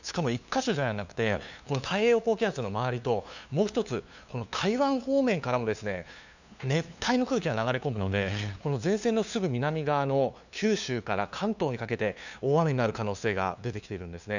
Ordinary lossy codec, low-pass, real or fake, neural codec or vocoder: none; 7.2 kHz; fake; codec, 16 kHz, 2 kbps, FunCodec, trained on LibriTTS, 25 frames a second